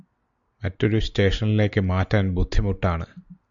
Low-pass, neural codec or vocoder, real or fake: 7.2 kHz; none; real